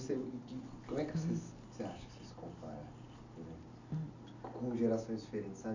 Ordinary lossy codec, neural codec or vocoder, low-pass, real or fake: none; none; 7.2 kHz; real